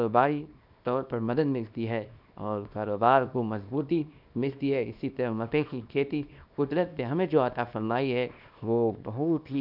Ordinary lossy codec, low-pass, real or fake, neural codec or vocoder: none; 5.4 kHz; fake; codec, 24 kHz, 0.9 kbps, WavTokenizer, small release